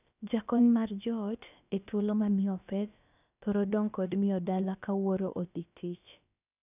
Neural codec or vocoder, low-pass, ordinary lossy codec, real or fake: codec, 16 kHz, about 1 kbps, DyCAST, with the encoder's durations; 3.6 kHz; none; fake